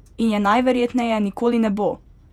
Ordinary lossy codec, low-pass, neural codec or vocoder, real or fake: none; 19.8 kHz; vocoder, 48 kHz, 128 mel bands, Vocos; fake